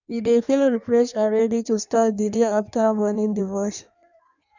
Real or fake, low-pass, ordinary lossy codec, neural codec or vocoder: fake; 7.2 kHz; none; codec, 16 kHz in and 24 kHz out, 1.1 kbps, FireRedTTS-2 codec